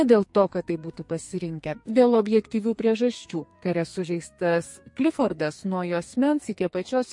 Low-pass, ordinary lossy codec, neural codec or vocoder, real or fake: 10.8 kHz; MP3, 48 kbps; codec, 44.1 kHz, 2.6 kbps, SNAC; fake